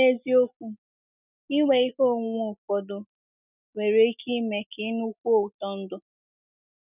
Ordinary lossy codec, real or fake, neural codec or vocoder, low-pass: none; real; none; 3.6 kHz